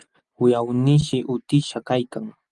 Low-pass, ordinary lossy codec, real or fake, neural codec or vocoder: 10.8 kHz; Opus, 24 kbps; real; none